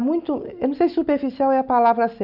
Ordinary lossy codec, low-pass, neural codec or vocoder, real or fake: none; 5.4 kHz; none; real